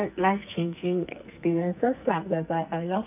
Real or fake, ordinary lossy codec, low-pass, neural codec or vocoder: fake; none; 3.6 kHz; codec, 44.1 kHz, 2.6 kbps, SNAC